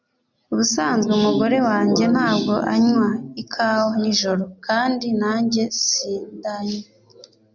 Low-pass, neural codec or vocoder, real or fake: 7.2 kHz; none; real